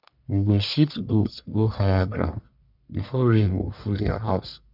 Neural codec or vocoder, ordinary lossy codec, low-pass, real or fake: codec, 44.1 kHz, 1.7 kbps, Pupu-Codec; none; 5.4 kHz; fake